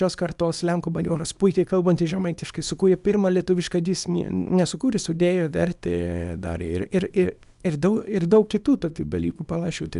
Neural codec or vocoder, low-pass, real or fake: codec, 24 kHz, 0.9 kbps, WavTokenizer, small release; 10.8 kHz; fake